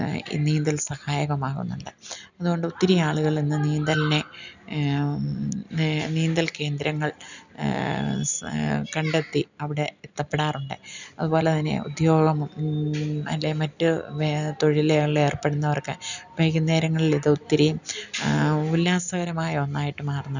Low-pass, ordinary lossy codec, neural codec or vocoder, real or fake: 7.2 kHz; none; none; real